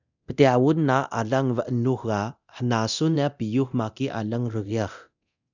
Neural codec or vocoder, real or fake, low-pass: codec, 24 kHz, 0.9 kbps, DualCodec; fake; 7.2 kHz